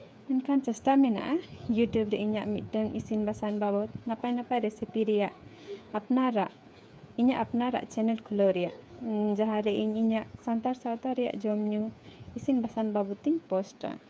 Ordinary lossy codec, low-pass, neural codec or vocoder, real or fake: none; none; codec, 16 kHz, 8 kbps, FreqCodec, smaller model; fake